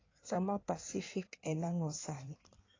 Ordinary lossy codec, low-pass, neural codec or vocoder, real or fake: AAC, 32 kbps; 7.2 kHz; codec, 16 kHz in and 24 kHz out, 1.1 kbps, FireRedTTS-2 codec; fake